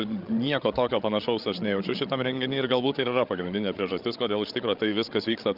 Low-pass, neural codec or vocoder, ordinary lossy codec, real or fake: 5.4 kHz; codec, 16 kHz, 16 kbps, FreqCodec, larger model; Opus, 24 kbps; fake